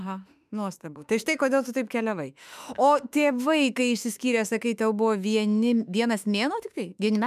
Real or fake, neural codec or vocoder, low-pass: fake; autoencoder, 48 kHz, 32 numbers a frame, DAC-VAE, trained on Japanese speech; 14.4 kHz